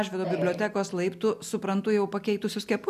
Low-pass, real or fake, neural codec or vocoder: 14.4 kHz; real; none